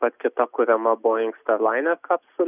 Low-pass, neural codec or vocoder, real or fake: 3.6 kHz; none; real